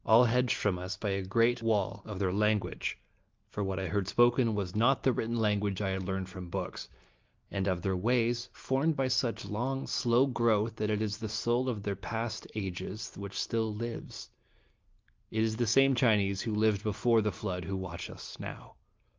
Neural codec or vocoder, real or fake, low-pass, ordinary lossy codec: none; real; 7.2 kHz; Opus, 32 kbps